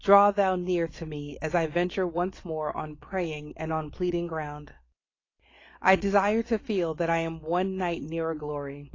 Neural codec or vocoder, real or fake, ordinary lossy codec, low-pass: none; real; AAC, 32 kbps; 7.2 kHz